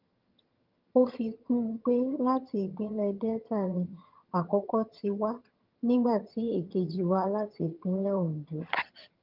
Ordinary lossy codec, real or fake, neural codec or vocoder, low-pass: Opus, 32 kbps; fake; vocoder, 22.05 kHz, 80 mel bands, HiFi-GAN; 5.4 kHz